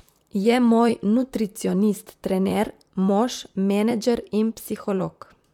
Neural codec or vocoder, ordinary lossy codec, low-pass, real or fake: vocoder, 44.1 kHz, 128 mel bands, Pupu-Vocoder; none; 19.8 kHz; fake